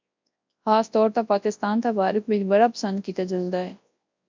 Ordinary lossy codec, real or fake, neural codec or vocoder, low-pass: MP3, 64 kbps; fake; codec, 24 kHz, 0.9 kbps, WavTokenizer, large speech release; 7.2 kHz